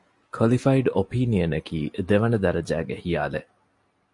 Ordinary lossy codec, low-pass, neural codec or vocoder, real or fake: MP3, 48 kbps; 10.8 kHz; none; real